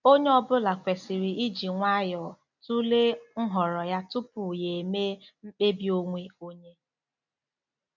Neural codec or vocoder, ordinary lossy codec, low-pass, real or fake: none; none; 7.2 kHz; real